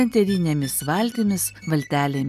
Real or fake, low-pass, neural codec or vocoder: real; 14.4 kHz; none